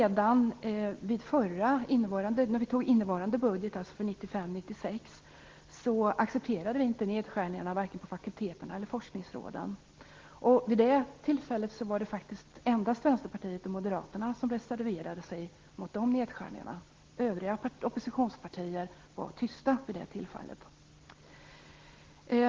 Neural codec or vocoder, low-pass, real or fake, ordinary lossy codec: none; 7.2 kHz; real; Opus, 16 kbps